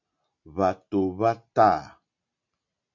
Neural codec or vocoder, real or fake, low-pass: none; real; 7.2 kHz